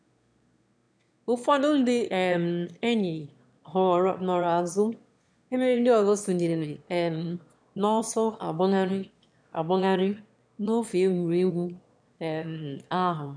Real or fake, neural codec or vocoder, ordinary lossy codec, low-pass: fake; autoencoder, 22.05 kHz, a latent of 192 numbers a frame, VITS, trained on one speaker; none; 9.9 kHz